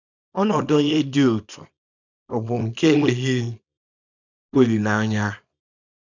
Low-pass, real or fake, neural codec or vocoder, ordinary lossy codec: 7.2 kHz; fake; codec, 24 kHz, 0.9 kbps, WavTokenizer, small release; none